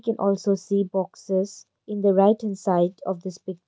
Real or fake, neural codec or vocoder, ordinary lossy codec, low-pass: real; none; none; none